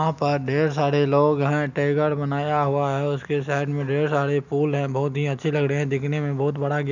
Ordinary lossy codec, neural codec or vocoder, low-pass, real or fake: none; none; 7.2 kHz; real